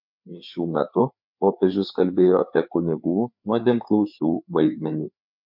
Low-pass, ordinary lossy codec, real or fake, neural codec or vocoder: 5.4 kHz; MP3, 48 kbps; fake; codec, 16 kHz, 4 kbps, FreqCodec, larger model